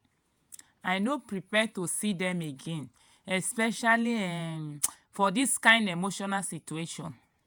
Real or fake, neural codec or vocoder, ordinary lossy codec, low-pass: fake; vocoder, 48 kHz, 128 mel bands, Vocos; none; none